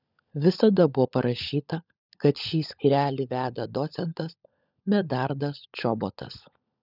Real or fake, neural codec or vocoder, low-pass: fake; codec, 16 kHz, 16 kbps, FunCodec, trained on LibriTTS, 50 frames a second; 5.4 kHz